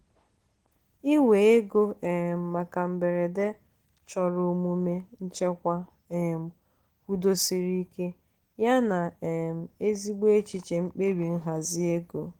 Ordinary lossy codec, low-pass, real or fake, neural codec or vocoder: Opus, 16 kbps; 19.8 kHz; real; none